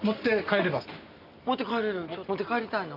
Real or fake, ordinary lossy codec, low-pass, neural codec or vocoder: real; none; 5.4 kHz; none